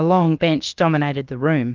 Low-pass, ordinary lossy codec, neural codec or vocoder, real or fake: 7.2 kHz; Opus, 32 kbps; codec, 16 kHz, about 1 kbps, DyCAST, with the encoder's durations; fake